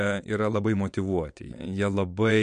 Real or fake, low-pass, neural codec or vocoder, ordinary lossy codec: fake; 9.9 kHz; vocoder, 22.05 kHz, 80 mel bands, WaveNeXt; MP3, 64 kbps